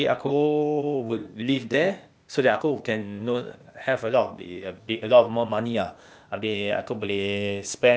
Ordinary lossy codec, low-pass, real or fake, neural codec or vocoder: none; none; fake; codec, 16 kHz, 0.8 kbps, ZipCodec